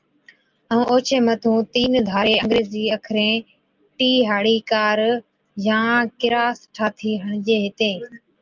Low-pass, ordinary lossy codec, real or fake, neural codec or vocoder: 7.2 kHz; Opus, 24 kbps; real; none